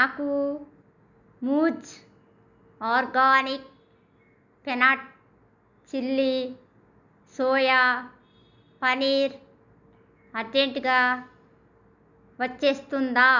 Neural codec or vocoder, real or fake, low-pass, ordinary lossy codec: none; real; 7.2 kHz; none